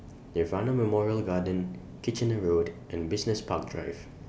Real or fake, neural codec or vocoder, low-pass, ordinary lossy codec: real; none; none; none